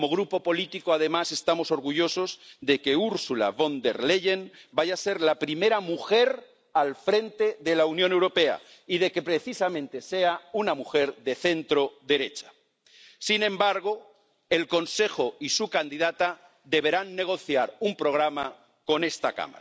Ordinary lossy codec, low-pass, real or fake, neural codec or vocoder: none; none; real; none